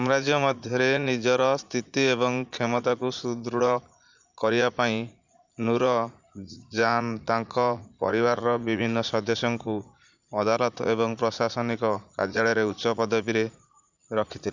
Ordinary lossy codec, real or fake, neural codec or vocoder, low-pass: Opus, 64 kbps; fake; vocoder, 44.1 kHz, 80 mel bands, Vocos; 7.2 kHz